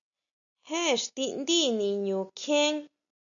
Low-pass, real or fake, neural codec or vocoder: 7.2 kHz; real; none